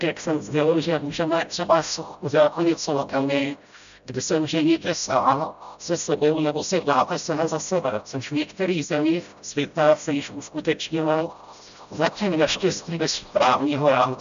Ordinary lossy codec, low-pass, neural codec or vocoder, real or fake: AAC, 96 kbps; 7.2 kHz; codec, 16 kHz, 0.5 kbps, FreqCodec, smaller model; fake